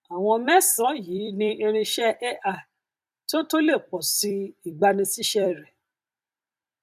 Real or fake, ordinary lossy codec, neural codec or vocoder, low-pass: fake; none; vocoder, 44.1 kHz, 128 mel bands every 256 samples, BigVGAN v2; 14.4 kHz